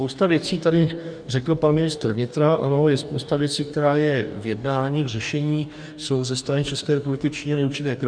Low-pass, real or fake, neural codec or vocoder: 9.9 kHz; fake; codec, 44.1 kHz, 2.6 kbps, DAC